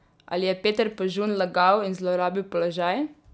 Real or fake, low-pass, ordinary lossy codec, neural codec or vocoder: real; none; none; none